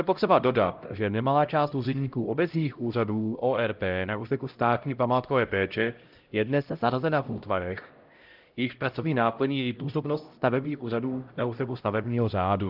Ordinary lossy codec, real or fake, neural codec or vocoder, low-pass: Opus, 32 kbps; fake; codec, 16 kHz, 0.5 kbps, X-Codec, HuBERT features, trained on LibriSpeech; 5.4 kHz